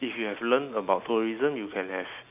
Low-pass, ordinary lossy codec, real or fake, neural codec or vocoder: 3.6 kHz; none; real; none